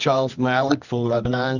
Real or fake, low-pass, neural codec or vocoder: fake; 7.2 kHz; codec, 24 kHz, 0.9 kbps, WavTokenizer, medium music audio release